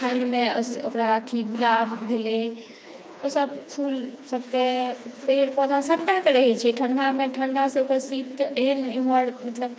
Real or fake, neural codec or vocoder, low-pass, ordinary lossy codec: fake; codec, 16 kHz, 2 kbps, FreqCodec, smaller model; none; none